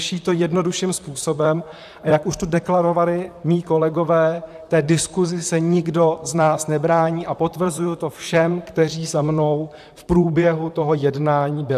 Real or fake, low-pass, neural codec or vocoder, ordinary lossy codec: fake; 14.4 kHz; vocoder, 44.1 kHz, 128 mel bands, Pupu-Vocoder; AAC, 96 kbps